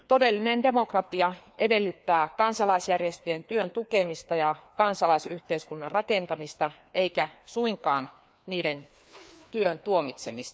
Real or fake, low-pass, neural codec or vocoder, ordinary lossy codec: fake; none; codec, 16 kHz, 2 kbps, FreqCodec, larger model; none